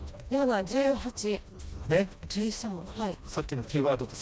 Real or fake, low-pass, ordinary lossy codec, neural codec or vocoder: fake; none; none; codec, 16 kHz, 1 kbps, FreqCodec, smaller model